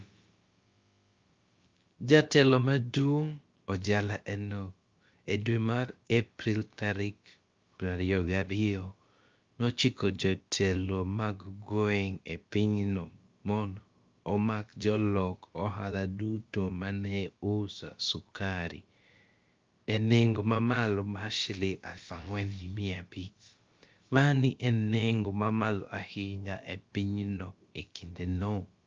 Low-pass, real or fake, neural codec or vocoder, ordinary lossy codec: 7.2 kHz; fake; codec, 16 kHz, about 1 kbps, DyCAST, with the encoder's durations; Opus, 24 kbps